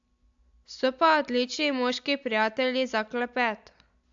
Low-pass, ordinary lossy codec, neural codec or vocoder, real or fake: 7.2 kHz; AAC, 64 kbps; none; real